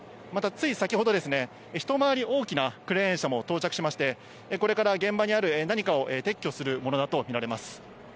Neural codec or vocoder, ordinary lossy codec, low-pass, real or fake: none; none; none; real